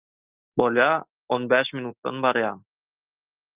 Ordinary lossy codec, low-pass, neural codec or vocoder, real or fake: Opus, 32 kbps; 3.6 kHz; none; real